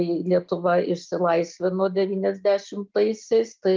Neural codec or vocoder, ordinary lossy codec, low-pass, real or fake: none; Opus, 24 kbps; 7.2 kHz; real